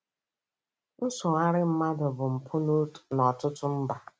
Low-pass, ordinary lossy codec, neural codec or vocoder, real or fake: none; none; none; real